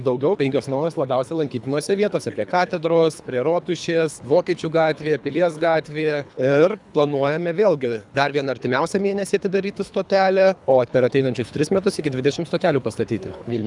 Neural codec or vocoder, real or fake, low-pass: codec, 24 kHz, 3 kbps, HILCodec; fake; 10.8 kHz